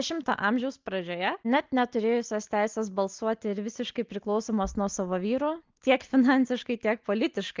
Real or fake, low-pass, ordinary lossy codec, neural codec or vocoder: real; 7.2 kHz; Opus, 32 kbps; none